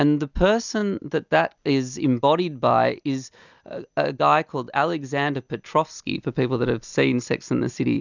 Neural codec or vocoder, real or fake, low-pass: none; real; 7.2 kHz